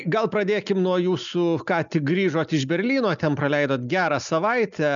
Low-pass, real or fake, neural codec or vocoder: 7.2 kHz; real; none